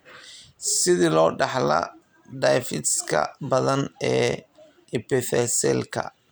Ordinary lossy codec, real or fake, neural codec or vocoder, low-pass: none; fake; vocoder, 44.1 kHz, 128 mel bands every 512 samples, BigVGAN v2; none